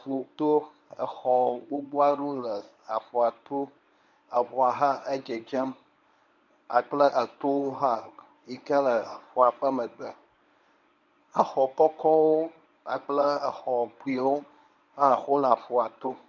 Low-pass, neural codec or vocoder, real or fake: 7.2 kHz; codec, 24 kHz, 0.9 kbps, WavTokenizer, medium speech release version 1; fake